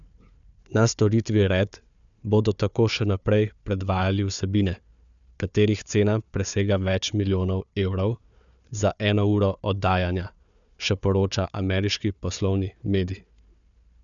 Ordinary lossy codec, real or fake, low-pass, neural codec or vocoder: none; fake; 7.2 kHz; codec, 16 kHz, 4 kbps, FunCodec, trained on Chinese and English, 50 frames a second